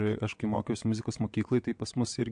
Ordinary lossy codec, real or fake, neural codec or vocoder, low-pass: MP3, 64 kbps; fake; vocoder, 22.05 kHz, 80 mel bands, WaveNeXt; 9.9 kHz